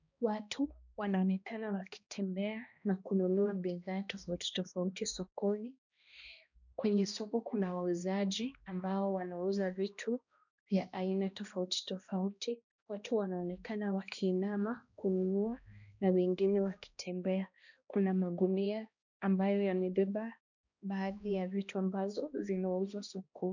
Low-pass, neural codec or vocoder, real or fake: 7.2 kHz; codec, 16 kHz, 1 kbps, X-Codec, HuBERT features, trained on balanced general audio; fake